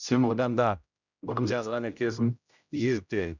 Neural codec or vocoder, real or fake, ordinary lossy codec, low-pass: codec, 16 kHz, 0.5 kbps, X-Codec, HuBERT features, trained on general audio; fake; none; 7.2 kHz